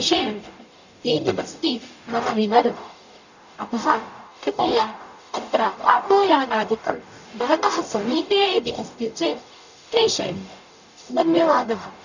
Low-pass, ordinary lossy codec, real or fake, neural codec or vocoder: 7.2 kHz; none; fake; codec, 44.1 kHz, 0.9 kbps, DAC